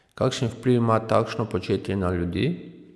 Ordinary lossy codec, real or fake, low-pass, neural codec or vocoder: none; real; none; none